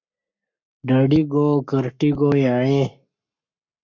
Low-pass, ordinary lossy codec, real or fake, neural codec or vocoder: 7.2 kHz; MP3, 64 kbps; fake; codec, 44.1 kHz, 7.8 kbps, Pupu-Codec